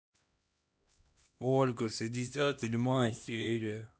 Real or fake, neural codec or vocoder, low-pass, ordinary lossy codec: fake; codec, 16 kHz, 1 kbps, X-Codec, HuBERT features, trained on LibriSpeech; none; none